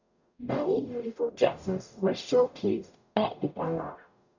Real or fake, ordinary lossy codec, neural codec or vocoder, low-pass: fake; none; codec, 44.1 kHz, 0.9 kbps, DAC; 7.2 kHz